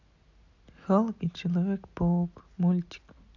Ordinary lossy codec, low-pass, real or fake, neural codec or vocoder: none; 7.2 kHz; real; none